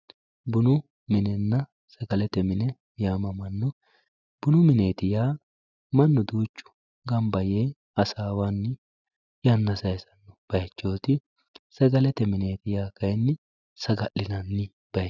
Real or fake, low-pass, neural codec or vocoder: real; 7.2 kHz; none